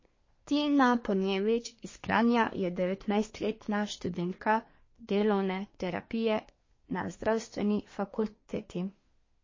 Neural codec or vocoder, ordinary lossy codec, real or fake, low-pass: codec, 24 kHz, 1 kbps, SNAC; MP3, 32 kbps; fake; 7.2 kHz